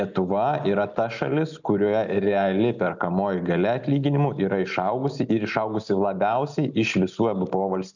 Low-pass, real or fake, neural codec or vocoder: 7.2 kHz; real; none